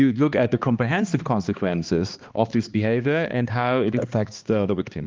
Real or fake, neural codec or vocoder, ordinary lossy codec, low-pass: fake; codec, 16 kHz, 2 kbps, X-Codec, HuBERT features, trained on balanced general audio; Opus, 32 kbps; 7.2 kHz